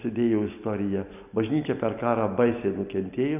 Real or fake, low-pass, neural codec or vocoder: real; 3.6 kHz; none